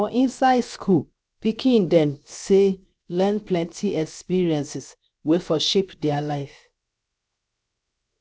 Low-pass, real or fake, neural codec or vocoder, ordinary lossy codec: none; fake; codec, 16 kHz, 0.7 kbps, FocalCodec; none